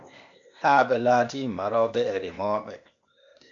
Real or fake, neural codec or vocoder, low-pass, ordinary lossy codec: fake; codec, 16 kHz, 0.8 kbps, ZipCodec; 7.2 kHz; AAC, 64 kbps